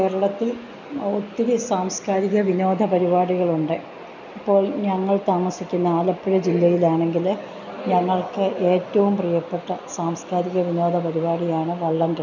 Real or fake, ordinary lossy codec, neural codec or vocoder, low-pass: real; none; none; 7.2 kHz